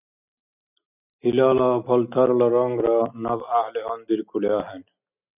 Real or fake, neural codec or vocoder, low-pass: real; none; 3.6 kHz